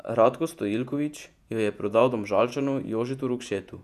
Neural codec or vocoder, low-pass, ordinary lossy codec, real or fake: vocoder, 48 kHz, 128 mel bands, Vocos; 14.4 kHz; none; fake